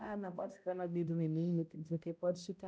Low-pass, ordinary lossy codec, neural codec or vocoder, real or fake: none; none; codec, 16 kHz, 0.5 kbps, X-Codec, HuBERT features, trained on balanced general audio; fake